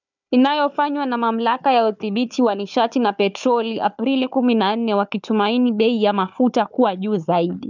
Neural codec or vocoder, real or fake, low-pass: codec, 16 kHz, 4 kbps, FunCodec, trained on Chinese and English, 50 frames a second; fake; 7.2 kHz